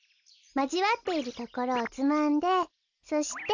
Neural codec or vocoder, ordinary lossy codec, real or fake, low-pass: none; none; real; 7.2 kHz